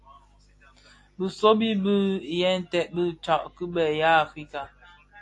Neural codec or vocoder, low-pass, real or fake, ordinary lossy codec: none; 10.8 kHz; real; AAC, 48 kbps